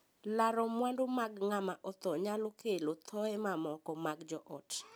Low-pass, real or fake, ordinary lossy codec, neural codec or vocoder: none; real; none; none